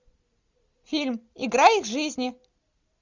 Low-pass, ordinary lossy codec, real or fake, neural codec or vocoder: 7.2 kHz; Opus, 64 kbps; real; none